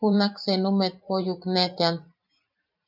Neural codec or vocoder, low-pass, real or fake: vocoder, 44.1 kHz, 128 mel bands every 512 samples, BigVGAN v2; 5.4 kHz; fake